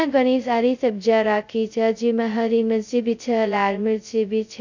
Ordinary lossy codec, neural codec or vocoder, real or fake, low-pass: none; codec, 16 kHz, 0.2 kbps, FocalCodec; fake; 7.2 kHz